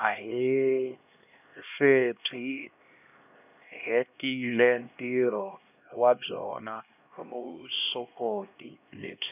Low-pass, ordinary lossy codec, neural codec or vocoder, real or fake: 3.6 kHz; none; codec, 16 kHz, 1 kbps, X-Codec, HuBERT features, trained on LibriSpeech; fake